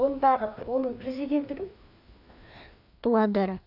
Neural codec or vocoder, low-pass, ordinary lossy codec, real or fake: codec, 16 kHz, 2 kbps, FreqCodec, larger model; 5.4 kHz; AAC, 48 kbps; fake